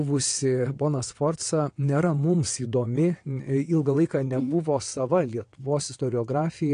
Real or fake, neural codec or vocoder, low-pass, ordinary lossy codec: fake; vocoder, 22.05 kHz, 80 mel bands, WaveNeXt; 9.9 kHz; AAC, 48 kbps